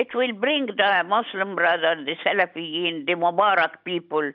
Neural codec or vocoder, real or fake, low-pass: none; real; 5.4 kHz